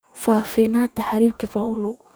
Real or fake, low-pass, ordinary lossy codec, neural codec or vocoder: fake; none; none; codec, 44.1 kHz, 2.6 kbps, DAC